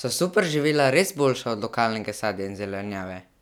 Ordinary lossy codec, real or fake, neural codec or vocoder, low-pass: none; real; none; 19.8 kHz